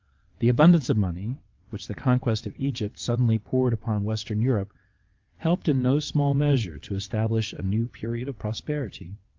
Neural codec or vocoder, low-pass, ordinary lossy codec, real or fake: vocoder, 22.05 kHz, 80 mel bands, WaveNeXt; 7.2 kHz; Opus, 24 kbps; fake